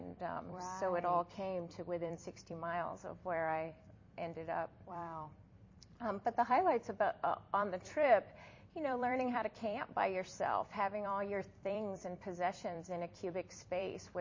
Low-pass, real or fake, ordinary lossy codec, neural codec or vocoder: 7.2 kHz; fake; MP3, 32 kbps; vocoder, 44.1 kHz, 128 mel bands every 256 samples, BigVGAN v2